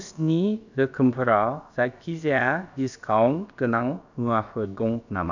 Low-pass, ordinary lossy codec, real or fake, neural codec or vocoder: 7.2 kHz; none; fake; codec, 16 kHz, about 1 kbps, DyCAST, with the encoder's durations